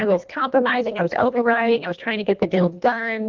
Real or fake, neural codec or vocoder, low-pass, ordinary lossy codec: fake; codec, 24 kHz, 1.5 kbps, HILCodec; 7.2 kHz; Opus, 32 kbps